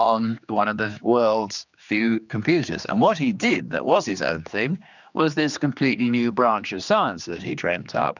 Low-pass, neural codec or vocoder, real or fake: 7.2 kHz; codec, 16 kHz, 2 kbps, X-Codec, HuBERT features, trained on general audio; fake